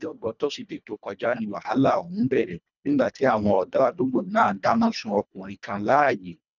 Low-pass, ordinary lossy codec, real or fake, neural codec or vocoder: 7.2 kHz; none; fake; codec, 24 kHz, 1.5 kbps, HILCodec